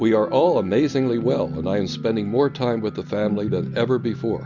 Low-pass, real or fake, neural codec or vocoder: 7.2 kHz; real; none